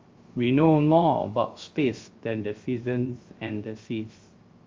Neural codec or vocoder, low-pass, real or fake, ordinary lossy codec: codec, 16 kHz, 0.3 kbps, FocalCodec; 7.2 kHz; fake; Opus, 32 kbps